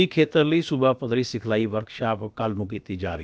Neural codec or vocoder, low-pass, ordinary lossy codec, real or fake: codec, 16 kHz, about 1 kbps, DyCAST, with the encoder's durations; none; none; fake